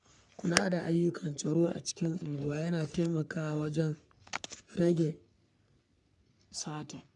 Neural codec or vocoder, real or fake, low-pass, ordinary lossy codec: codec, 44.1 kHz, 3.4 kbps, Pupu-Codec; fake; 10.8 kHz; none